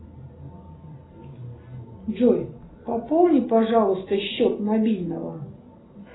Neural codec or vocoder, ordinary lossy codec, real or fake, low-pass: none; AAC, 16 kbps; real; 7.2 kHz